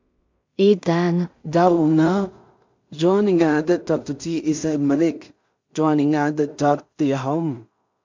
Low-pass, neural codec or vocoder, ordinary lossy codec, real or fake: 7.2 kHz; codec, 16 kHz in and 24 kHz out, 0.4 kbps, LongCat-Audio-Codec, two codebook decoder; MP3, 64 kbps; fake